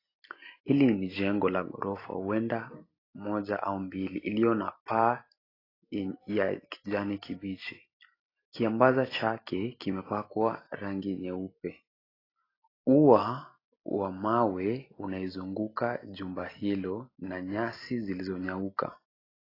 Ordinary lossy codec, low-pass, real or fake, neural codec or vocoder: AAC, 24 kbps; 5.4 kHz; real; none